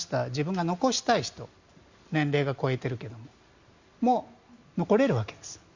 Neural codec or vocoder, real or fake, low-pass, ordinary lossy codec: none; real; 7.2 kHz; Opus, 64 kbps